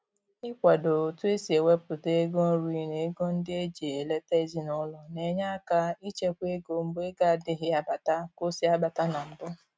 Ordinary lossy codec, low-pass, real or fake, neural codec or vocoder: none; none; real; none